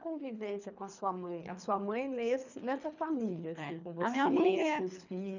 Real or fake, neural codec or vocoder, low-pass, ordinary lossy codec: fake; codec, 24 kHz, 3 kbps, HILCodec; 7.2 kHz; none